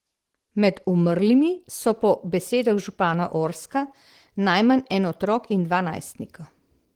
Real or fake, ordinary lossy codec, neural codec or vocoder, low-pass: fake; Opus, 16 kbps; vocoder, 44.1 kHz, 128 mel bands every 512 samples, BigVGAN v2; 19.8 kHz